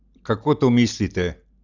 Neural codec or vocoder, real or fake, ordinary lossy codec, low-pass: none; real; none; 7.2 kHz